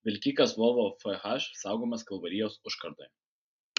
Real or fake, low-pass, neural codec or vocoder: real; 7.2 kHz; none